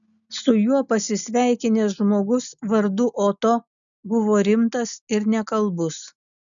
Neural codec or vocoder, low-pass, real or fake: none; 7.2 kHz; real